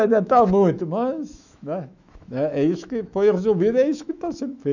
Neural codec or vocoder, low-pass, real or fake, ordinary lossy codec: autoencoder, 48 kHz, 128 numbers a frame, DAC-VAE, trained on Japanese speech; 7.2 kHz; fake; none